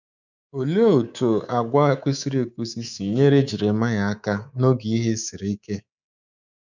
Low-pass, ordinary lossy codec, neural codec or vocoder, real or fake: 7.2 kHz; none; codec, 24 kHz, 3.1 kbps, DualCodec; fake